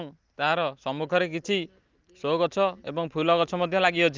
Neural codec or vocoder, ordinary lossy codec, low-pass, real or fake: none; Opus, 24 kbps; 7.2 kHz; real